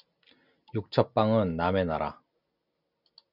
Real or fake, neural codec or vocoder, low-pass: real; none; 5.4 kHz